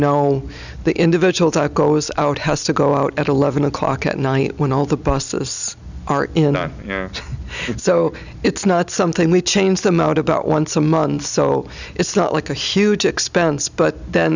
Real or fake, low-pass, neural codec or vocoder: real; 7.2 kHz; none